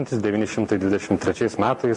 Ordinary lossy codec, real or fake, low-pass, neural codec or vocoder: MP3, 48 kbps; real; 10.8 kHz; none